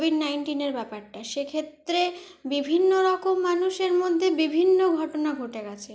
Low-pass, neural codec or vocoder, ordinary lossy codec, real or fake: none; none; none; real